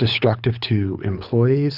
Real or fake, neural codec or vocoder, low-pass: fake; codec, 24 kHz, 6 kbps, HILCodec; 5.4 kHz